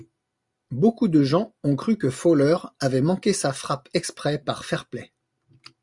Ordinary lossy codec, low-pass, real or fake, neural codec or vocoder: Opus, 64 kbps; 10.8 kHz; real; none